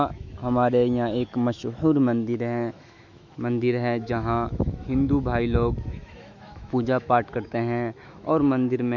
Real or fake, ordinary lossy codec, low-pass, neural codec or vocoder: fake; none; 7.2 kHz; autoencoder, 48 kHz, 128 numbers a frame, DAC-VAE, trained on Japanese speech